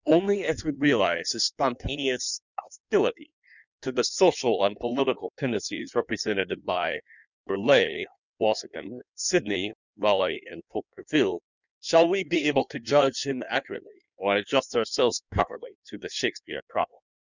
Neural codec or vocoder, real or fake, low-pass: codec, 16 kHz in and 24 kHz out, 1.1 kbps, FireRedTTS-2 codec; fake; 7.2 kHz